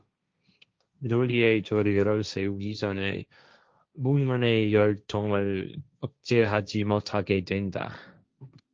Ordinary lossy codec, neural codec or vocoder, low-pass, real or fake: Opus, 24 kbps; codec, 16 kHz, 1.1 kbps, Voila-Tokenizer; 7.2 kHz; fake